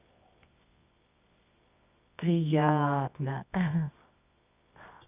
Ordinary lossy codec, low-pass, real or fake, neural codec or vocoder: none; 3.6 kHz; fake; codec, 24 kHz, 0.9 kbps, WavTokenizer, medium music audio release